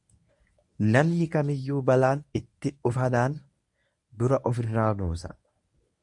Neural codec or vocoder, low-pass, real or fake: codec, 24 kHz, 0.9 kbps, WavTokenizer, medium speech release version 1; 10.8 kHz; fake